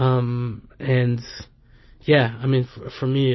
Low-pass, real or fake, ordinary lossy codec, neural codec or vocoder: 7.2 kHz; real; MP3, 24 kbps; none